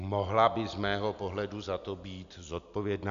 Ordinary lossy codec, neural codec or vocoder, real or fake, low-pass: MP3, 96 kbps; none; real; 7.2 kHz